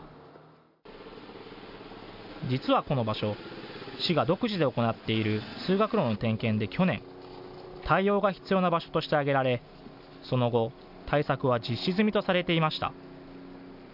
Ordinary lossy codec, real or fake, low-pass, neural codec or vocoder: none; real; 5.4 kHz; none